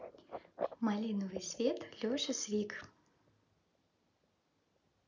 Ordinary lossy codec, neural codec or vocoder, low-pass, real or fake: none; none; 7.2 kHz; real